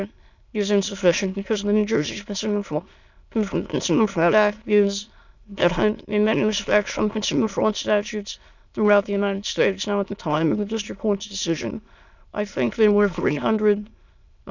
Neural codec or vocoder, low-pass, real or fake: autoencoder, 22.05 kHz, a latent of 192 numbers a frame, VITS, trained on many speakers; 7.2 kHz; fake